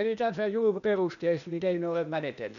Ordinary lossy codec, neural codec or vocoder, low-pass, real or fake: none; codec, 16 kHz, 0.8 kbps, ZipCodec; 7.2 kHz; fake